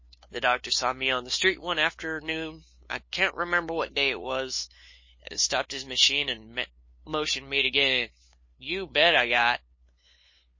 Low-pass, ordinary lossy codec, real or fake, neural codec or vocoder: 7.2 kHz; MP3, 32 kbps; fake; codec, 16 kHz, 2 kbps, FunCodec, trained on LibriTTS, 25 frames a second